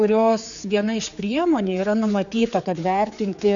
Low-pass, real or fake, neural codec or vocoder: 7.2 kHz; fake; codec, 16 kHz, 4 kbps, X-Codec, HuBERT features, trained on general audio